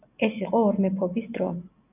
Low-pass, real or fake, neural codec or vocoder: 3.6 kHz; real; none